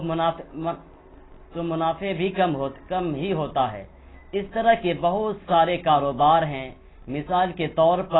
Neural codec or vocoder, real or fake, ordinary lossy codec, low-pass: none; real; AAC, 16 kbps; 7.2 kHz